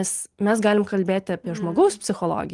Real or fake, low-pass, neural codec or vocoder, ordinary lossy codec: real; 10.8 kHz; none; Opus, 24 kbps